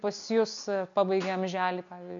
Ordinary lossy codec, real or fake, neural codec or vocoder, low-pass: AAC, 64 kbps; real; none; 7.2 kHz